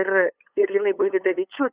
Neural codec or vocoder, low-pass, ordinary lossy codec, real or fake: codec, 16 kHz, 8 kbps, FunCodec, trained on LibriTTS, 25 frames a second; 3.6 kHz; Opus, 64 kbps; fake